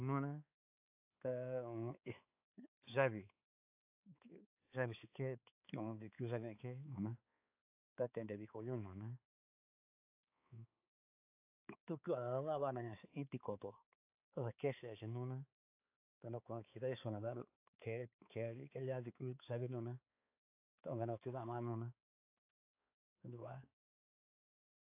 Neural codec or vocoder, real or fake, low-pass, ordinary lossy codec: codec, 16 kHz, 4 kbps, X-Codec, HuBERT features, trained on balanced general audio; fake; 3.6 kHz; AAC, 32 kbps